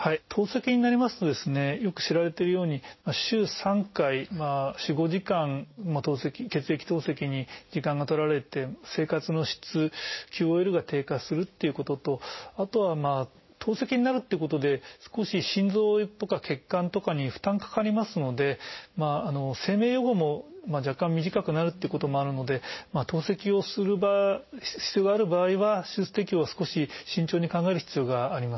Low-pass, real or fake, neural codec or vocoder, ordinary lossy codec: 7.2 kHz; real; none; MP3, 24 kbps